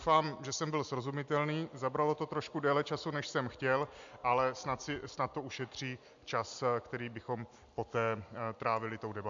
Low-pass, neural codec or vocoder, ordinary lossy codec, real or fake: 7.2 kHz; none; AAC, 64 kbps; real